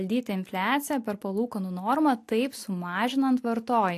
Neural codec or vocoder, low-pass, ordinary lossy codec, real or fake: none; 14.4 kHz; AAC, 64 kbps; real